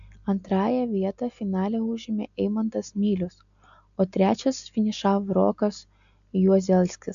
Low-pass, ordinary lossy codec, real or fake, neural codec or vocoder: 7.2 kHz; AAC, 96 kbps; real; none